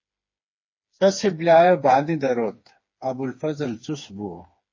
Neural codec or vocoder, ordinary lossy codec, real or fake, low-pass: codec, 16 kHz, 4 kbps, FreqCodec, smaller model; MP3, 32 kbps; fake; 7.2 kHz